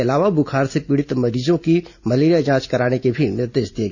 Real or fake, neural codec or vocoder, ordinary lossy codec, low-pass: real; none; none; 7.2 kHz